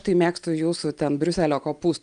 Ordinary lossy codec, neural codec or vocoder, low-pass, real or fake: Opus, 32 kbps; none; 9.9 kHz; real